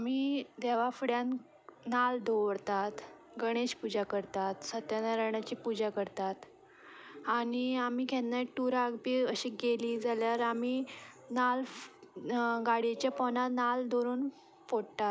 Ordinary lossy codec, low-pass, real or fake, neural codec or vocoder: none; none; real; none